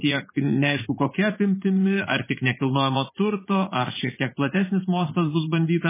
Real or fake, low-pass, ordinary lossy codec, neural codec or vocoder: fake; 3.6 kHz; MP3, 16 kbps; vocoder, 44.1 kHz, 80 mel bands, Vocos